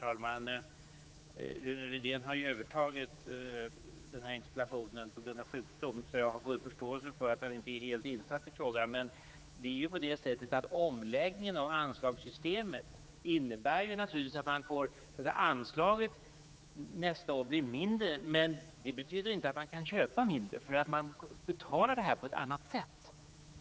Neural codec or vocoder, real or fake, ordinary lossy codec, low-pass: codec, 16 kHz, 4 kbps, X-Codec, HuBERT features, trained on general audio; fake; none; none